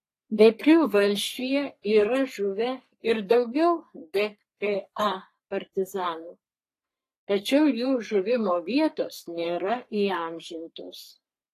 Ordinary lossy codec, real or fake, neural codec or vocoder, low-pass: AAC, 48 kbps; fake; codec, 44.1 kHz, 3.4 kbps, Pupu-Codec; 14.4 kHz